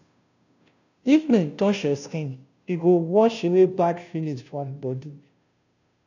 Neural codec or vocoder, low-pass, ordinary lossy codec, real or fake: codec, 16 kHz, 0.5 kbps, FunCodec, trained on Chinese and English, 25 frames a second; 7.2 kHz; none; fake